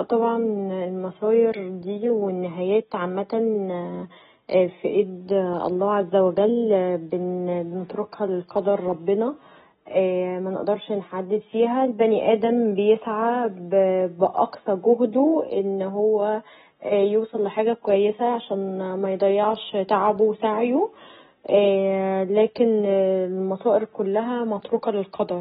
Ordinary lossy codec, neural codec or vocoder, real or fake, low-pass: AAC, 16 kbps; none; real; 7.2 kHz